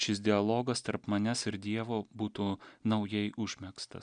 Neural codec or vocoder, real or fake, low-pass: none; real; 9.9 kHz